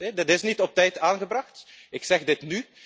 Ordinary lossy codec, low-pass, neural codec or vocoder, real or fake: none; none; none; real